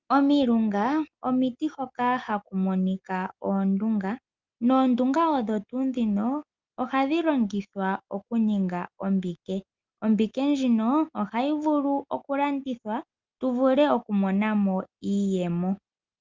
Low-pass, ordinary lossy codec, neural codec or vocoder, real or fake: 7.2 kHz; Opus, 24 kbps; none; real